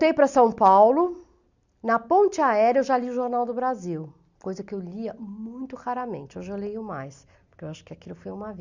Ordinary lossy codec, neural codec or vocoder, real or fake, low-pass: Opus, 64 kbps; none; real; 7.2 kHz